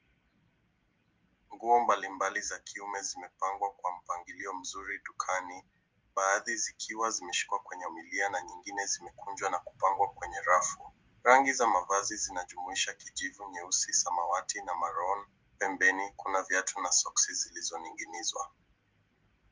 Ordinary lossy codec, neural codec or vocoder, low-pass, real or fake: Opus, 32 kbps; none; 7.2 kHz; real